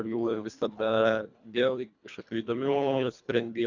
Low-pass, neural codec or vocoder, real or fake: 7.2 kHz; codec, 24 kHz, 1.5 kbps, HILCodec; fake